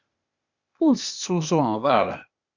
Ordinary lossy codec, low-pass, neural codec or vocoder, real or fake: Opus, 64 kbps; 7.2 kHz; codec, 16 kHz, 0.8 kbps, ZipCodec; fake